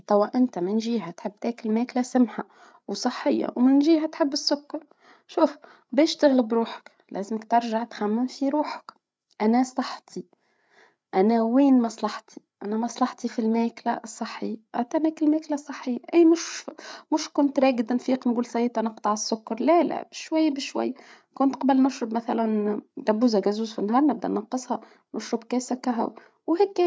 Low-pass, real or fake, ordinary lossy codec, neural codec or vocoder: none; fake; none; codec, 16 kHz, 8 kbps, FreqCodec, larger model